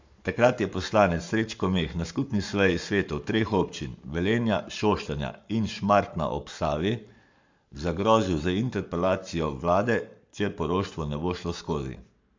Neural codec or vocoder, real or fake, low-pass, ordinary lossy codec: codec, 44.1 kHz, 7.8 kbps, Pupu-Codec; fake; 7.2 kHz; MP3, 64 kbps